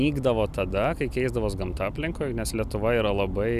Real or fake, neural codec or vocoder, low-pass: real; none; 14.4 kHz